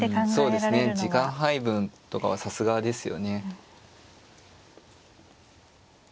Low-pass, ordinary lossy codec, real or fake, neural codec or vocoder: none; none; real; none